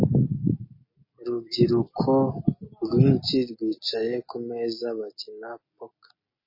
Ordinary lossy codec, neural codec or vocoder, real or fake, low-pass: MP3, 24 kbps; none; real; 5.4 kHz